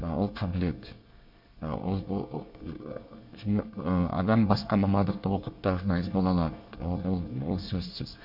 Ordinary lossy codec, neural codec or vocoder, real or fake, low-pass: MP3, 48 kbps; codec, 24 kHz, 1 kbps, SNAC; fake; 5.4 kHz